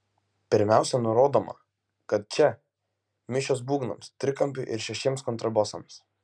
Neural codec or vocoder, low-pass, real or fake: none; 9.9 kHz; real